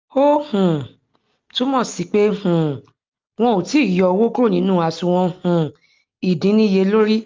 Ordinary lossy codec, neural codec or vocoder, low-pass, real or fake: Opus, 24 kbps; none; 7.2 kHz; real